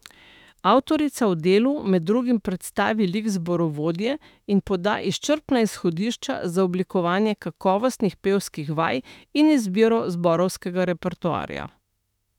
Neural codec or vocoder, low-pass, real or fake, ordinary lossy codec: autoencoder, 48 kHz, 32 numbers a frame, DAC-VAE, trained on Japanese speech; 19.8 kHz; fake; none